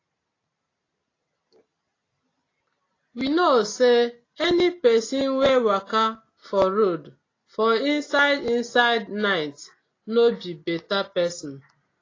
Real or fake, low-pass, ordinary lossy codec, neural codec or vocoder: real; 7.2 kHz; AAC, 32 kbps; none